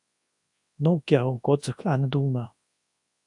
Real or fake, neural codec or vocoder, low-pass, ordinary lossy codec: fake; codec, 24 kHz, 0.9 kbps, WavTokenizer, large speech release; 10.8 kHz; AAC, 64 kbps